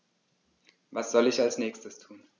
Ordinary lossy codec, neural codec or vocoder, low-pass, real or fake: none; none; 7.2 kHz; real